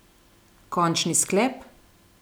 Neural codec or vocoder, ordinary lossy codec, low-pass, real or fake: none; none; none; real